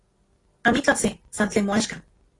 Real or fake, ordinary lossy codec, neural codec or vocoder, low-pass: real; AAC, 32 kbps; none; 10.8 kHz